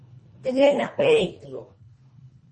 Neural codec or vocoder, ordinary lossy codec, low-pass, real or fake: codec, 24 kHz, 1.5 kbps, HILCodec; MP3, 32 kbps; 10.8 kHz; fake